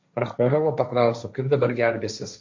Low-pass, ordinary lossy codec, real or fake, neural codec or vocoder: 7.2 kHz; MP3, 48 kbps; fake; codec, 16 kHz, 1.1 kbps, Voila-Tokenizer